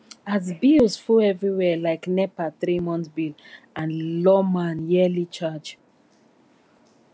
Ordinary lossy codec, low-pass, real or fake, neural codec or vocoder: none; none; real; none